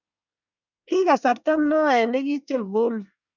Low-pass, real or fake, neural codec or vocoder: 7.2 kHz; fake; codec, 24 kHz, 1 kbps, SNAC